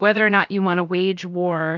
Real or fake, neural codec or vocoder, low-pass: fake; codec, 16 kHz, 0.7 kbps, FocalCodec; 7.2 kHz